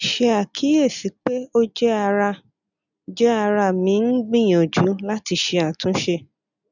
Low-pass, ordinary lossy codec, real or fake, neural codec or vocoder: 7.2 kHz; none; real; none